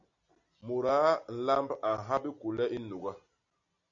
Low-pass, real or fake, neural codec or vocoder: 7.2 kHz; real; none